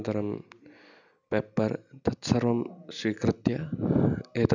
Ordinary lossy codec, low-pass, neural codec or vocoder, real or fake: none; 7.2 kHz; none; real